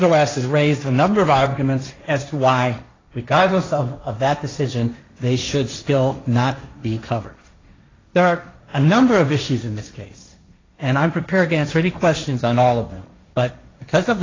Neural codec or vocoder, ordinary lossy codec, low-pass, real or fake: codec, 16 kHz, 1.1 kbps, Voila-Tokenizer; AAC, 32 kbps; 7.2 kHz; fake